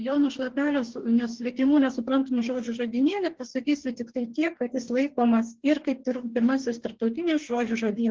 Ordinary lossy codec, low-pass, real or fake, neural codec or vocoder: Opus, 16 kbps; 7.2 kHz; fake; codec, 44.1 kHz, 2.6 kbps, DAC